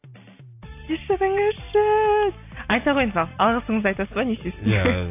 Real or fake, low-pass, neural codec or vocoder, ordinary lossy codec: real; 3.6 kHz; none; AAC, 32 kbps